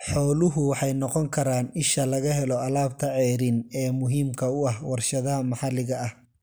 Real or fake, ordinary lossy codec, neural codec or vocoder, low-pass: real; none; none; none